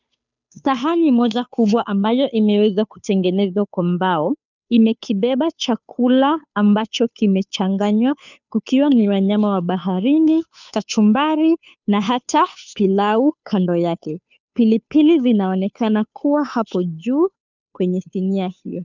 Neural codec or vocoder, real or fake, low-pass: codec, 16 kHz, 2 kbps, FunCodec, trained on Chinese and English, 25 frames a second; fake; 7.2 kHz